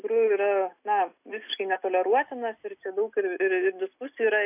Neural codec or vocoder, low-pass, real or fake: none; 3.6 kHz; real